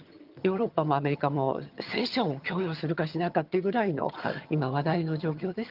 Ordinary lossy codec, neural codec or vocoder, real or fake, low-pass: Opus, 32 kbps; vocoder, 22.05 kHz, 80 mel bands, HiFi-GAN; fake; 5.4 kHz